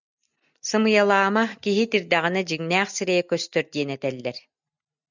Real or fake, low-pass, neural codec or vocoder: real; 7.2 kHz; none